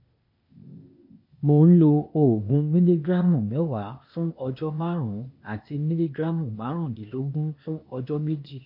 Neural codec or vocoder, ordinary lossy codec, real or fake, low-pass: codec, 16 kHz, 0.8 kbps, ZipCodec; MP3, 32 kbps; fake; 5.4 kHz